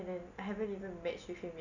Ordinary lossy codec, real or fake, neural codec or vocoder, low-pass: none; real; none; 7.2 kHz